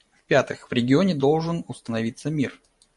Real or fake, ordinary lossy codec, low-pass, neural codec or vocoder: fake; MP3, 48 kbps; 14.4 kHz; vocoder, 44.1 kHz, 128 mel bands every 512 samples, BigVGAN v2